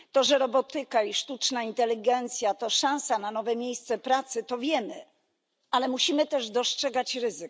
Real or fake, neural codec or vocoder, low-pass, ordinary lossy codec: real; none; none; none